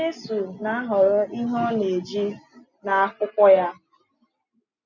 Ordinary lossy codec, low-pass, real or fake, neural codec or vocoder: none; 7.2 kHz; real; none